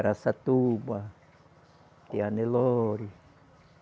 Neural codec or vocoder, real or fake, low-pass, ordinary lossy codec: none; real; none; none